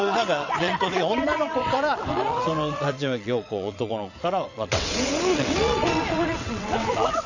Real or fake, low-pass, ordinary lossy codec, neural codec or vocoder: fake; 7.2 kHz; none; vocoder, 22.05 kHz, 80 mel bands, WaveNeXt